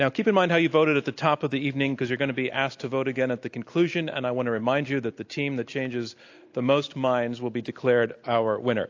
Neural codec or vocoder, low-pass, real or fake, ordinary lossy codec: none; 7.2 kHz; real; AAC, 48 kbps